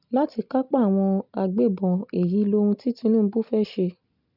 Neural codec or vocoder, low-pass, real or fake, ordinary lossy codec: none; 5.4 kHz; real; none